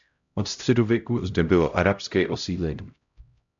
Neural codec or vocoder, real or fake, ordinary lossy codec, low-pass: codec, 16 kHz, 0.5 kbps, X-Codec, HuBERT features, trained on LibriSpeech; fake; MP3, 64 kbps; 7.2 kHz